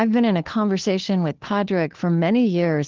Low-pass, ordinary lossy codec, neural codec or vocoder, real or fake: 7.2 kHz; Opus, 16 kbps; codec, 16 kHz, 2 kbps, FunCodec, trained on Chinese and English, 25 frames a second; fake